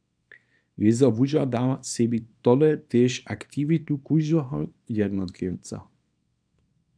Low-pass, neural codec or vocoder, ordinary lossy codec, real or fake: 9.9 kHz; codec, 24 kHz, 0.9 kbps, WavTokenizer, small release; MP3, 96 kbps; fake